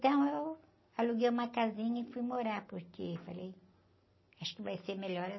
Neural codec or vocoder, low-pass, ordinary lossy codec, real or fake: none; 7.2 kHz; MP3, 24 kbps; real